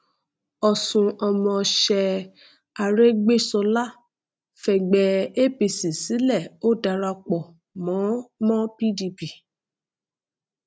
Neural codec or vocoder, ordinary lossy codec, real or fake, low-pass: none; none; real; none